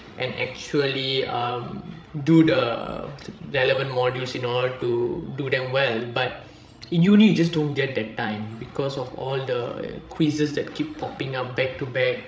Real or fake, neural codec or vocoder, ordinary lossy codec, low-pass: fake; codec, 16 kHz, 16 kbps, FreqCodec, larger model; none; none